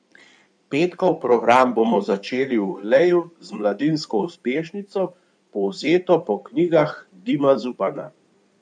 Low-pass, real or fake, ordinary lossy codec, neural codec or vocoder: 9.9 kHz; fake; none; codec, 16 kHz in and 24 kHz out, 2.2 kbps, FireRedTTS-2 codec